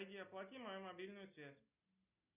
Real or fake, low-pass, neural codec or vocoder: real; 3.6 kHz; none